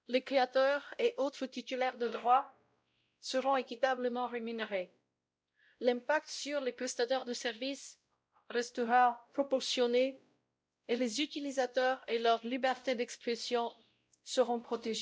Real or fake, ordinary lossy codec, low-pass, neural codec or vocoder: fake; none; none; codec, 16 kHz, 0.5 kbps, X-Codec, WavLM features, trained on Multilingual LibriSpeech